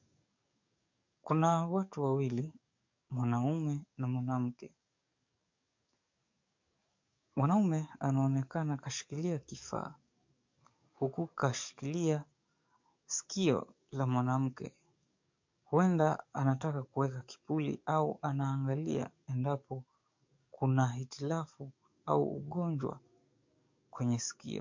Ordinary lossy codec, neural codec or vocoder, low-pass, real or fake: MP3, 48 kbps; codec, 44.1 kHz, 7.8 kbps, DAC; 7.2 kHz; fake